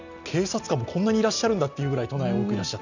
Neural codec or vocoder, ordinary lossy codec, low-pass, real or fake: none; none; 7.2 kHz; real